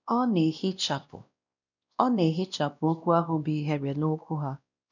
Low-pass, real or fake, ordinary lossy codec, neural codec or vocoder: 7.2 kHz; fake; none; codec, 16 kHz, 1 kbps, X-Codec, WavLM features, trained on Multilingual LibriSpeech